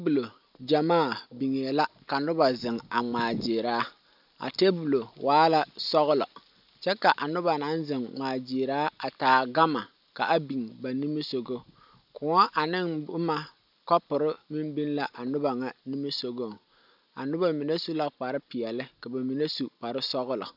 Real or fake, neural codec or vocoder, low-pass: real; none; 5.4 kHz